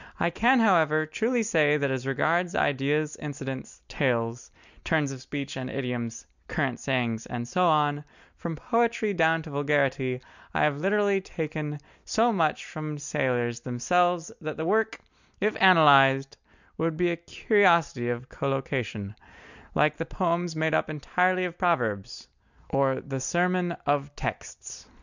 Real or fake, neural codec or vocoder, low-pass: real; none; 7.2 kHz